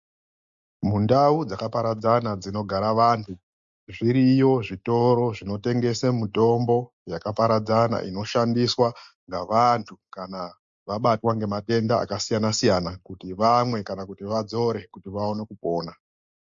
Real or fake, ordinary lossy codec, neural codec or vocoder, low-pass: real; MP3, 48 kbps; none; 7.2 kHz